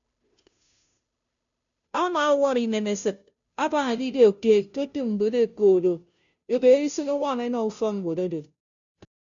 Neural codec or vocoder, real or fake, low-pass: codec, 16 kHz, 0.5 kbps, FunCodec, trained on Chinese and English, 25 frames a second; fake; 7.2 kHz